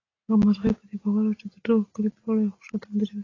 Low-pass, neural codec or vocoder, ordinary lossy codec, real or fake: 7.2 kHz; none; AAC, 32 kbps; real